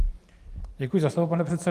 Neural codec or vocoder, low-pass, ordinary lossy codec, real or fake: vocoder, 44.1 kHz, 128 mel bands every 512 samples, BigVGAN v2; 14.4 kHz; Opus, 32 kbps; fake